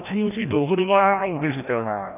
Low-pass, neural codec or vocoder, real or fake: 3.6 kHz; codec, 16 kHz, 1 kbps, FreqCodec, larger model; fake